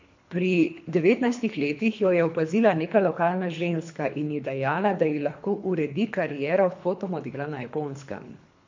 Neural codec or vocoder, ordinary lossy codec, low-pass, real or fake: codec, 24 kHz, 3 kbps, HILCodec; MP3, 48 kbps; 7.2 kHz; fake